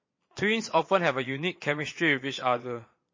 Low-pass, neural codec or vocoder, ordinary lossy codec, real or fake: 7.2 kHz; vocoder, 22.05 kHz, 80 mel bands, Vocos; MP3, 32 kbps; fake